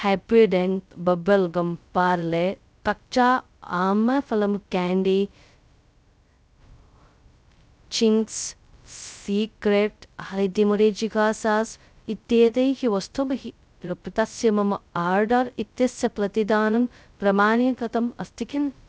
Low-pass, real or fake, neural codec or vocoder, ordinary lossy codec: none; fake; codec, 16 kHz, 0.2 kbps, FocalCodec; none